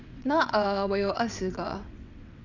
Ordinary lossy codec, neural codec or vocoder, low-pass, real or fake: none; vocoder, 22.05 kHz, 80 mel bands, WaveNeXt; 7.2 kHz; fake